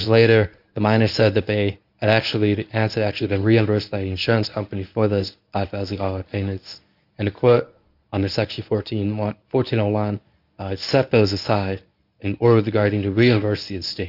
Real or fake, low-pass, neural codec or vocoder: fake; 5.4 kHz; codec, 24 kHz, 0.9 kbps, WavTokenizer, medium speech release version 1